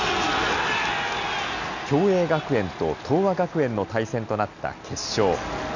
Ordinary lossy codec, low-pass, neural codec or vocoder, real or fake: none; 7.2 kHz; none; real